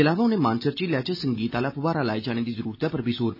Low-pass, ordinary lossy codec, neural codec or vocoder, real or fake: 5.4 kHz; none; none; real